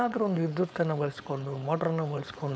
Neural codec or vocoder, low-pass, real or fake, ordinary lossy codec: codec, 16 kHz, 4.8 kbps, FACodec; none; fake; none